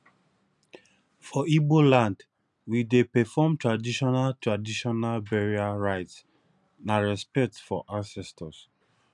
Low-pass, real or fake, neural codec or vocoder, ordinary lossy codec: 10.8 kHz; real; none; none